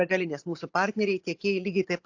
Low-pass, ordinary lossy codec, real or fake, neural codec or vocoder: 7.2 kHz; AAC, 48 kbps; real; none